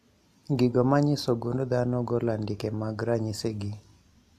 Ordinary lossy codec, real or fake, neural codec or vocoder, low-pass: AAC, 96 kbps; real; none; 14.4 kHz